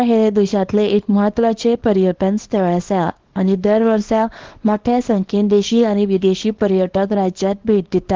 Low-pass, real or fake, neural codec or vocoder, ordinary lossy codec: 7.2 kHz; fake; codec, 24 kHz, 0.9 kbps, WavTokenizer, small release; Opus, 16 kbps